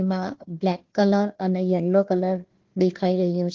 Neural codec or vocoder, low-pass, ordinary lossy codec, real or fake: codec, 16 kHz, 1 kbps, FunCodec, trained on Chinese and English, 50 frames a second; 7.2 kHz; Opus, 16 kbps; fake